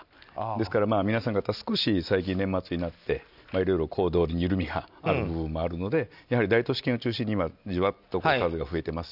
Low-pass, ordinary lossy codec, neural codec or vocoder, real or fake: 5.4 kHz; none; none; real